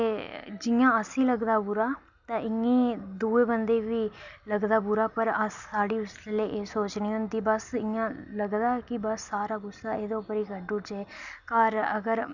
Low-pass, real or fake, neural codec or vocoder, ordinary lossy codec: 7.2 kHz; real; none; none